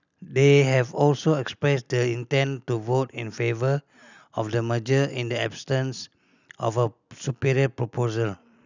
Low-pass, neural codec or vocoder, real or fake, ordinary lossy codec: 7.2 kHz; none; real; none